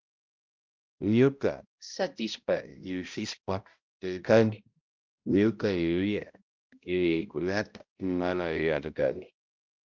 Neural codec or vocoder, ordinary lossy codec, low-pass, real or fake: codec, 16 kHz, 0.5 kbps, X-Codec, HuBERT features, trained on balanced general audio; Opus, 24 kbps; 7.2 kHz; fake